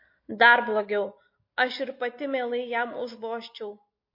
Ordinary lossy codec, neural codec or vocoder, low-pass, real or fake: MP3, 32 kbps; none; 5.4 kHz; real